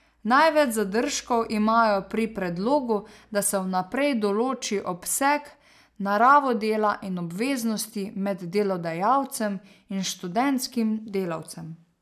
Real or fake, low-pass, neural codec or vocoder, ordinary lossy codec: real; 14.4 kHz; none; none